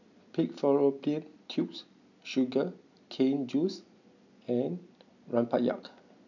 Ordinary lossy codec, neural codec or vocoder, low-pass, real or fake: none; none; 7.2 kHz; real